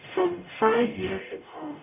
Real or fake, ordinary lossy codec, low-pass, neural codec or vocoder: fake; none; 3.6 kHz; codec, 44.1 kHz, 0.9 kbps, DAC